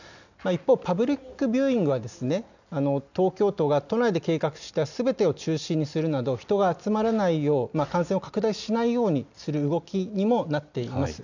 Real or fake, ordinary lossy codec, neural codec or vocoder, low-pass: real; none; none; 7.2 kHz